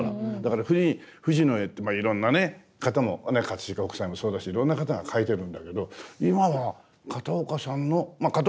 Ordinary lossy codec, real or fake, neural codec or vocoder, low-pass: none; real; none; none